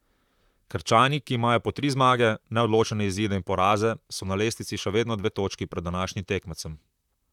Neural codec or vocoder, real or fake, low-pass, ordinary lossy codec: vocoder, 44.1 kHz, 128 mel bands, Pupu-Vocoder; fake; 19.8 kHz; none